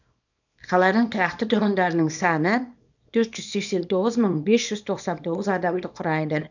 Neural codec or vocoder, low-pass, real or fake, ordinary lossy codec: codec, 24 kHz, 0.9 kbps, WavTokenizer, small release; 7.2 kHz; fake; none